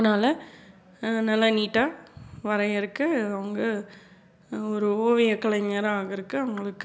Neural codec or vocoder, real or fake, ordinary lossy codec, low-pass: none; real; none; none